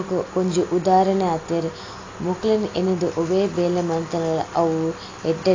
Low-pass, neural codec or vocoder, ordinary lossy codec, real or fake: 7.2 kHz; none; AAC, 32 kbps; real